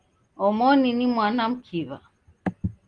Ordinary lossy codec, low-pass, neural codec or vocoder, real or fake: Opus, 24 kbps; 9.9 kHz; none; real